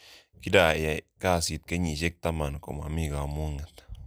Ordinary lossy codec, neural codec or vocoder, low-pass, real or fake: none; none; none; real